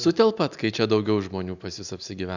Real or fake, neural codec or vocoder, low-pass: real; none; 7.2 kHz